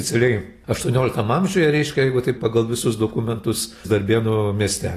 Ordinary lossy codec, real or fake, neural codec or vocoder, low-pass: AAC, 48 kbps; real; none; 14.4 kHz